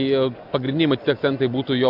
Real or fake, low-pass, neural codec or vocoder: real; 5.4 kHz; none